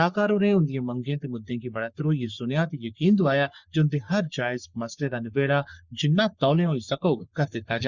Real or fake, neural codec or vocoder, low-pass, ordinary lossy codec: fake; codec, 44.1 kHz, 3.4 kbps, Pupu-Codec; 7.2 kHz; Opus, 64 kbps